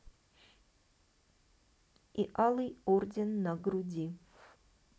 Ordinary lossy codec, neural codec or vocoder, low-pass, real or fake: none; none; none; real